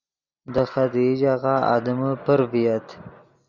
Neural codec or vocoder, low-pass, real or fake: none; 7.2 kHz; real